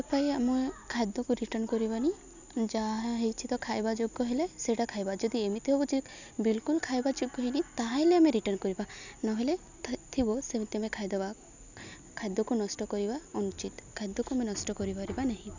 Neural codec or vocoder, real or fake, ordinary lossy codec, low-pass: none; real; none; 7.2 kHz